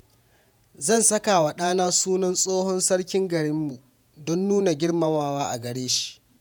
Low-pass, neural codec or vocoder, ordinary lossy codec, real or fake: none; vocoder, 48 kHz, 128 mel bands, Vocos; none; fake